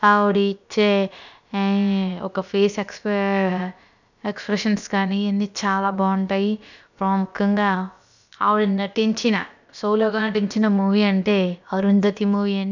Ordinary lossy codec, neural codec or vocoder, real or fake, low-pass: none; codec, 16 kHz, about 1 kbps, DyCAST, with the encoder's durations; fake; 7.2 kHz